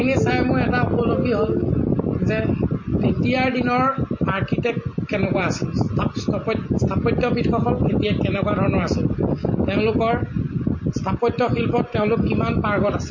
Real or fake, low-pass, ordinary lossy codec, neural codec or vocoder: real; 7.2 kHz; MP3, 32 kbps; none